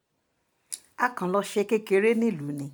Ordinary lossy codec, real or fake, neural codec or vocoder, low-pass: none; real; none; none